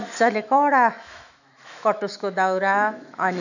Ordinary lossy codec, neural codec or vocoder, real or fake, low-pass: none; autoencoder, 48 kHz, 128 numbers a frame, DAC-VAE, trained on Japanese speech; fake; 7.2 kHz